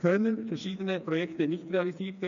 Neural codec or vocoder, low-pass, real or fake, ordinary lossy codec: codec, 16 kHz, 2 kbps, FreqCodec, smaller model; 7.2 kHz; fake; none